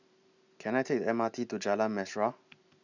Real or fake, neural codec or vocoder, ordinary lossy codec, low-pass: real; none; none; 7.2 kHz